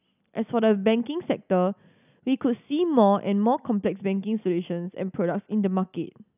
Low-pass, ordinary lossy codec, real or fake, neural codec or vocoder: 3.6 kHz; none; real; none